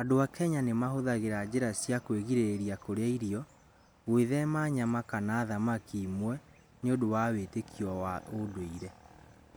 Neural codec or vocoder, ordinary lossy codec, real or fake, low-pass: none; none; real; none